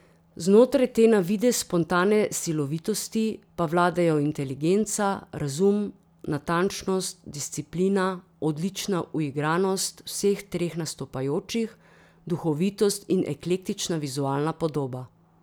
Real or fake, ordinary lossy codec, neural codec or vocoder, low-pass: real; none; none; none